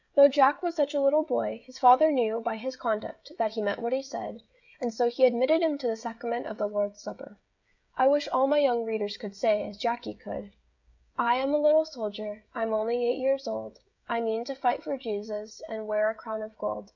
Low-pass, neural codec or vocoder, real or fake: 7.2 kHz; codec, 16 kHz, 16 kbps, FreqCodec, smaller model; fake